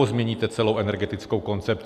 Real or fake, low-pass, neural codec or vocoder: real; 14.4 kHz; none